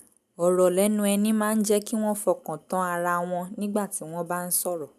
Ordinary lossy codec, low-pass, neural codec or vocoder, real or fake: none; 14.4 kHz; none; real